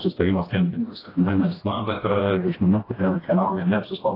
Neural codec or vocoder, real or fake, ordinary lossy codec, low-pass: codec, 16 kHz, 1 kbps, FreqCodec, smaller model; fake; AAC, 24 kbps; 5.4 kHz